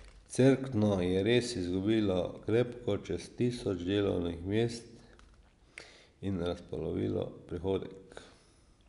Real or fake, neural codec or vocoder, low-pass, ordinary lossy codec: real; none; 10.8 kHz; none